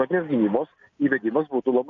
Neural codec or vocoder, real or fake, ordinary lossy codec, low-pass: none; real; MP3, 96 kbps; 7.2 kHz